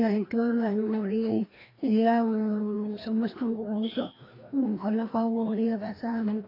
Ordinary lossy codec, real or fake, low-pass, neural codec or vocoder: AAC, 24 kbps; fake; 5.4 kHz; codec, 16 kHz, 1 kbps, FreqCodec, larger model